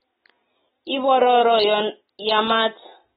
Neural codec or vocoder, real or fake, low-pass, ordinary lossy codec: none; real; 7.2 kHz; AAC, 16 kbps